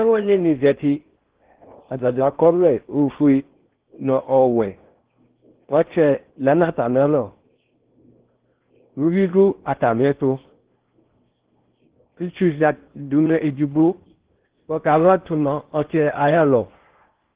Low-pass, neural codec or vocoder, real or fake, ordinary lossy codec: 3.6 kHz; codec, 16 kHz in and 24 kHz out, 0.6 kbps, FocalCodec, streaming, 4096 codes; fake; Opus, 16 kbps